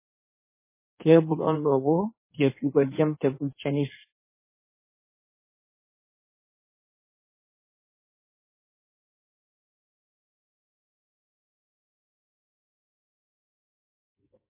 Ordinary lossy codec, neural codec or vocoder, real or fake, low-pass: MP3, 16 kbps; codec, 16 kHz in and 24 kHz out, 1.1 kbps, FireRedTTS-2 codec; fake; 3.6 kHz